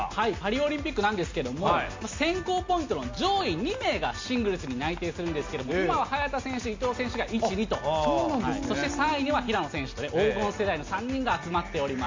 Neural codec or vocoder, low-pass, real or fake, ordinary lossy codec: none; 7.2 kHz; real; MP3, 48 kbps